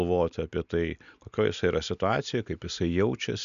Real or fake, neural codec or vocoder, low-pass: real; none; 7.2 kHz